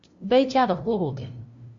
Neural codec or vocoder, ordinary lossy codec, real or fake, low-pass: codec, 16 kHz, 0.5 kbps, FunCodec, trained on LibriTTS, 25 frames a second; MP3, 48 kbps; fake; 7.2 kHz